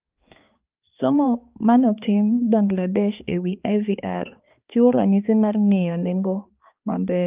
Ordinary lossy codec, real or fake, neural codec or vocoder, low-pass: Opus, 24 kbps; fake; codec, 16 kHz, 2 kbps, X-Codec, HuBERT features, trained on balanced general audio; 3.6 kHz